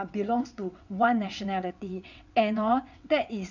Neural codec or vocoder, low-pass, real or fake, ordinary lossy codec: vocoder, 22.05 kHz, 80 mel bands, WaveNeXt; 7.2 kHz; fake; none